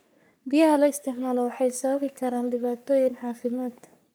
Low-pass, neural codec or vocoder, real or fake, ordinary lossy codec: none; codec, 44.1 kHz, 3.4 kbps, Pupu-Codec; fake; none